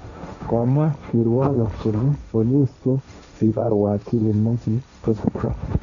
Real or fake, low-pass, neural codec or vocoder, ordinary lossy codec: fake; 7.2 kHz; codec, 16 kHz, 1.1 kbps, Voila-Tokenizer; none